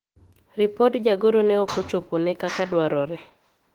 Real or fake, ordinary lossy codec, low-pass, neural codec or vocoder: fake; Opus, 16 kbps; 19.8 kHz; autoencoder, 48 kHz, 32 numbers a frame, DAC-VAE, trained on Japanese speech